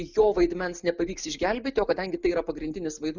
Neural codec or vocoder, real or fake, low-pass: none; real; 7.2 kHz